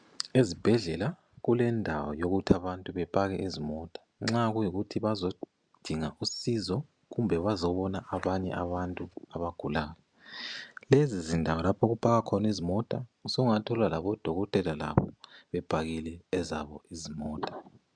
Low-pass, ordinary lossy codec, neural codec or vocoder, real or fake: 9.9 kHz; MP3, 96 kbps; none; real